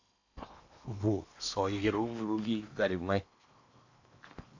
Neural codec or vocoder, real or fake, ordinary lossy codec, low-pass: codec, 16 kHz in and 24 kHz out, 0.8 kbps, FocalCodec, streaming, 65536 codes; fake; AAC, 48 kbps; 7.2 kHz